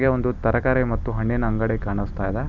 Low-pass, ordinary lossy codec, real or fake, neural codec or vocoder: 7.2 kHz; none; real; none